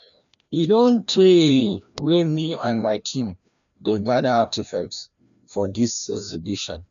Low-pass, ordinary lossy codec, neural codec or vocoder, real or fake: 7.2 kHz; none; codec, 16 kHz, 1 kbps, FreqCodec, larger model; fake